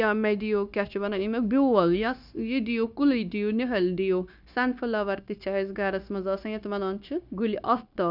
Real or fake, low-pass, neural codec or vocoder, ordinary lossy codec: fake; 5.4 kHz; codec, 16 kHz, 0.9 kbps, LongCat-Audio-Codec; none